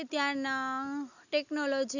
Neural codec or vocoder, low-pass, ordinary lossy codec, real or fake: none; 7.2 kHz; none; real